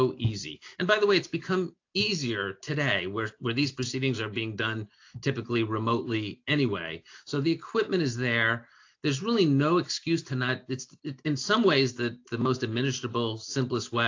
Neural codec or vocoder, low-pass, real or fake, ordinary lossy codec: none; 7.2 kHz; real; AAC, 48 kbps